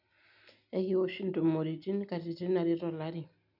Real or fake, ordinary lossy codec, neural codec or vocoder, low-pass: real; none; none; 5.4 kHz